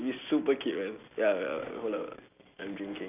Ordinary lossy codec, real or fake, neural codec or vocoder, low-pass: none; real; none; 3.6 kHz